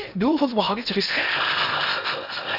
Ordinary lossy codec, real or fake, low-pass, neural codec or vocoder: none; fake; 5.4 kHz; codec, 16 kHz in and 24 kHz out, 0.6 kbps, FocalCodec, streaming, 4096 codes